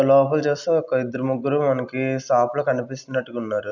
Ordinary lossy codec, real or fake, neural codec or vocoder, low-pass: none; real; none; 7.2 kHz